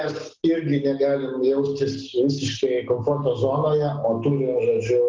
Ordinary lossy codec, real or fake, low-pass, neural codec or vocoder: Opus, 16 kbps; real; 7.2 kHz; none